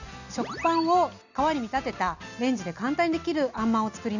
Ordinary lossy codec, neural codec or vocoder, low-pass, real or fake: none; none; 7.2 kHz; real